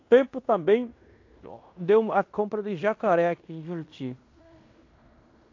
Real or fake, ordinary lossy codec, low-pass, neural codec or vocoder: fake; AAC, 48 kbps; 7.2 kHz; codec, 16 kHz in and 24 kHz out, 0.9 kbps, LongCat-Audio-Codec, fine tuned four codebook decoder